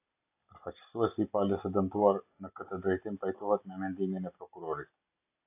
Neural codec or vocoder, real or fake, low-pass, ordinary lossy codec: none; real; 3.6 kHz; AAC, 24 kbps